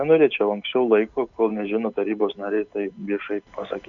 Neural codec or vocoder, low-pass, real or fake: none; 7.2 kHz; real